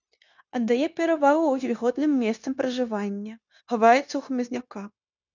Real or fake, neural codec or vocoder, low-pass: fake; codec, 16 kHz, 0.9 kbps, LongCat-Audio-Codec; 7.2 kHz